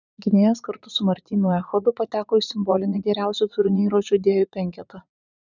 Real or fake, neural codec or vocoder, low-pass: fake; vocoder, 44.1 kHz, 80 mel bands, Vocos; 7.2 kHz